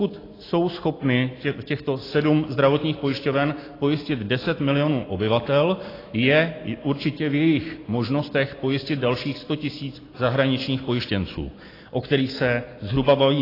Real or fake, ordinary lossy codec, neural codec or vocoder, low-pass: real; AAC, 24 kbps; none; 5.4 kHz